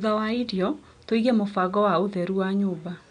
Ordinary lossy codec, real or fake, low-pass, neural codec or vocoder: none; real; 9.9 kHz; none